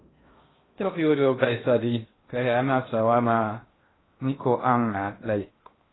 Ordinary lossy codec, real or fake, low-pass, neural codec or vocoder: AAC, 16 kbps; fake; 7.2 kHz; codec, 16 kHz in and 24 kHz out, 0.6 kbps, FocalCodec, streaming, 2048 codes